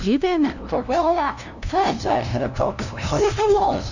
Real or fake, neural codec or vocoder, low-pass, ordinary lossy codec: fake; codec, 16 kHz, 0.5 kbps, FunCodec, trained on LibriTTS, 25 frames a second; 7.2 kHz; none